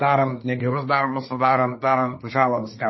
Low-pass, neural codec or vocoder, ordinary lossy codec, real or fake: 7.2 kHz; codec, 24 kHz, 1 kbps, SNAC; MP3, 24 kbps; fake